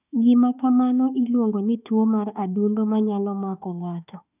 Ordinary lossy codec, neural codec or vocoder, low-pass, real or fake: none; autoencoder, 48 kHz, 32 numbers a frame, DAC-VAE, trained on Japanese speech; 3.6 kHz; fake